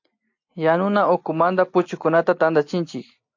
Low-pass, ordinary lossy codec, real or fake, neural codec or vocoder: 7.2 kHz; AAC, 48 kbps; real; none